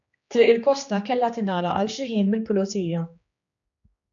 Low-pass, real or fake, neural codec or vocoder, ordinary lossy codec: 7.2 kHz; fake; codec, 16 kHz, 2 kbps, X-Codec, HuBERT features, trained on general audio; AAC, 64 kbps